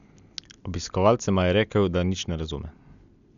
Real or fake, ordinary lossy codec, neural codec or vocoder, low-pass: real; none; none; 7.2 kHz